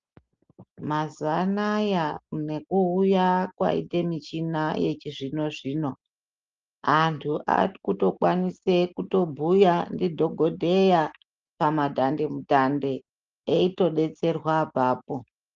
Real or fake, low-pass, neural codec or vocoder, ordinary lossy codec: real; 7.2 kHz; none; Opus, 24 kbps